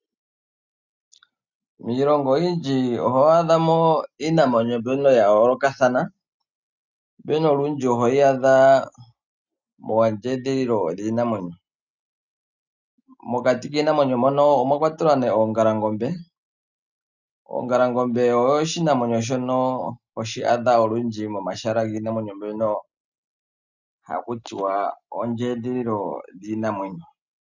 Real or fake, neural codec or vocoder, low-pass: real; none; 7.2 kHz